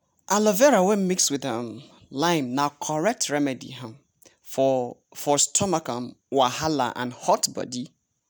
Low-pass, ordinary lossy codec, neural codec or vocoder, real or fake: none; none; none; real